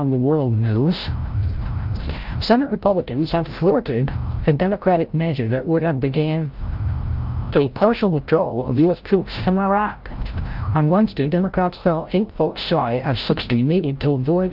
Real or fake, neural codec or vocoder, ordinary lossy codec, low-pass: fake; codec, 16 kHz, 0.5 kbps, FreqCodec, larger model; Opus, 24 kbps; 5.4 kHz